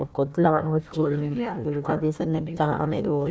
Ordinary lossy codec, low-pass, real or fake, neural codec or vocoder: none; none; fake; codec, 16 kHz, 1 kbps, FunCodec, trained on Chinese and English, 50 frames a second